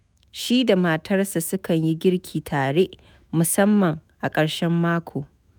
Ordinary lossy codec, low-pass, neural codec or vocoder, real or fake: none; none; autoencoder, 48 kHz, 128 numbers a frame, DAC-VAE, trained on Japanese speech; fake